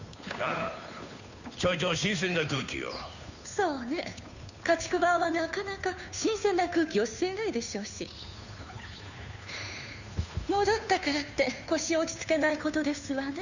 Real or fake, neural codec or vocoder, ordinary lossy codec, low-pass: fake; codec, 16 kHz, 2 kbps, FunCodec, trained on Chinese and English, 25 frames a second; none; 7.2 kHz